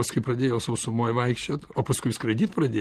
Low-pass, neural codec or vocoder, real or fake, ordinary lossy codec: 14.4 kHz; none; real; Opus, 16 kbps